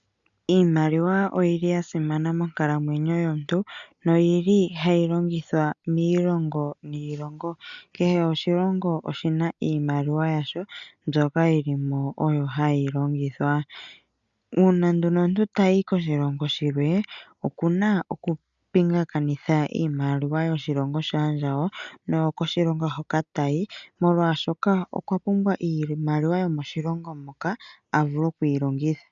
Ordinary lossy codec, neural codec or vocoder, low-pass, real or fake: MP3, 96 kbps; none; 7.2 kHz; real